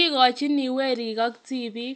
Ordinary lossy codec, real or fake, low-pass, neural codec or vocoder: none; real; none; none